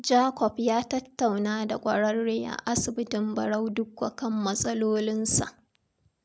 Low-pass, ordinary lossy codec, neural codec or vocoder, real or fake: none; none; none; real